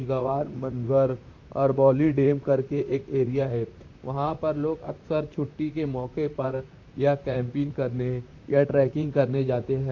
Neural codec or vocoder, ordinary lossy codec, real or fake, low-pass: vocoder, 44.1 kHz, 128 mel bands, Pupu-Vocoder; AAC, 48 kbps; fake; 7.2 kHz